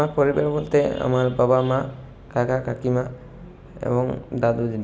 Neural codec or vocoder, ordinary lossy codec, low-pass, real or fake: none; none; none; real